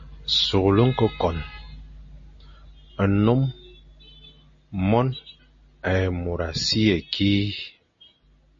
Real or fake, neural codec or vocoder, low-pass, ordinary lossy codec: real; none; 7.2 kHz; MP3, 32 kbps